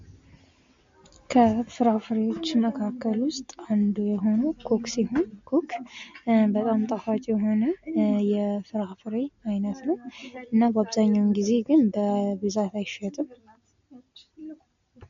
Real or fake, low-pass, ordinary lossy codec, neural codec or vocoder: real; 7.2 kHz; MP3, 48 kbps; none